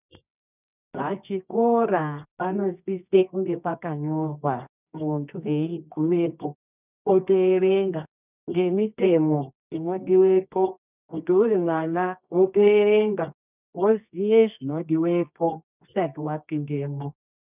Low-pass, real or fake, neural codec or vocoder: 3.6 kHz; fake; codec, 24 kHz, 0.9 kbps, WavTokenizer, medium music audio release